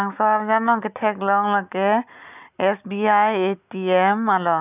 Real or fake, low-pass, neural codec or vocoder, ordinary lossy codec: real; 3.6 kHz; none; none